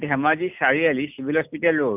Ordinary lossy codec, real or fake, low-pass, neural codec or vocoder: none; real; 3.6 kHz; none